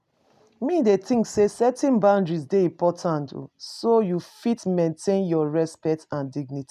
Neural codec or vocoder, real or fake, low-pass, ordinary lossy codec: none; real; 14.4 kHz; none